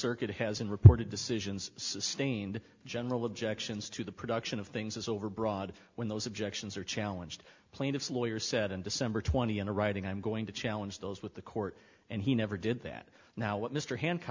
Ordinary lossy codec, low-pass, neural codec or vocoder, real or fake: MP3, 64 kbps; 7.2 kHz; none; real